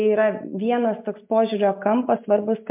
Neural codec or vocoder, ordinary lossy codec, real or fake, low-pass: none; MP3, 32 kbps; real; 3.6 kHz